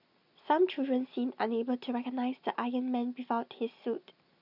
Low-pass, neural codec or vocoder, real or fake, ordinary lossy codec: 5.4 kHz; none; real; none